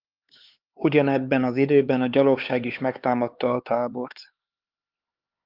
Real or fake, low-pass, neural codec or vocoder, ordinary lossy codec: fake; 5.4 kHz; codec, 16 kHz, 4 kbps, X-Codec, HuBERT features, trained on LibriSpeech; Opus, 24 kbps